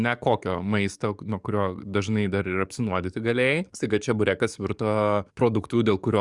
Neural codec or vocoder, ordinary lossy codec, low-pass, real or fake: codec, 44.1 kHz, 7.8 kbps, DAC; Opus, 64 kbps; 10.8 kHz; fake